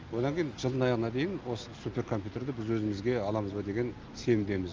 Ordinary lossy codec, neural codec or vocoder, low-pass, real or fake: Opus, 32 kbps; none; 7.2 kHz; real